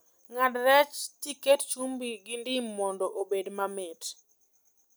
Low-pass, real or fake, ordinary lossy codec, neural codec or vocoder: none; real; none; none